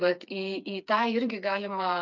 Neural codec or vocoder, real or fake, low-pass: codec, 16 kHz, 4 kbps, FreqCodec, smaller model; fake; 7.2 kHz